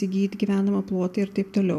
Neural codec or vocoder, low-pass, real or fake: none; 14.4 kHz; real